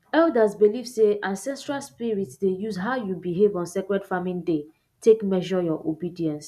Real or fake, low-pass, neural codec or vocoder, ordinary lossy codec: real; 14.4 kHz; none; none